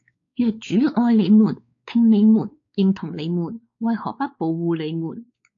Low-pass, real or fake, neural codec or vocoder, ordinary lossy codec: 7.2 kHz; fake; codec, 16 kHz, 4 kbps, X-Codec, WavLM features, trained on Multilingual LibriSpeech; AAC, 48 kbps